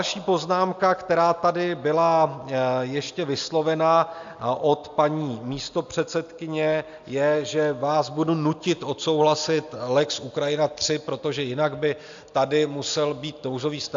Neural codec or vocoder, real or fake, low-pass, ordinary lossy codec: none; real; 7.2 kHz; AAC, 64 kbps